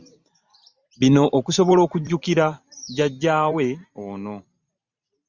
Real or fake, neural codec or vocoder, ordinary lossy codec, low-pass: fake; vocoder, 44.1 kHz, 128 mel bands every 512 samples, BigVGAN v2; Opus, 64 kbps; 7.2 kHz